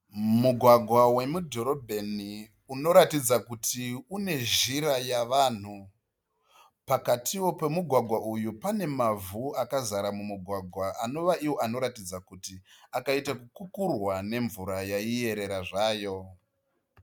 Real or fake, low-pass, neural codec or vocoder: real; 19.8 kHz; none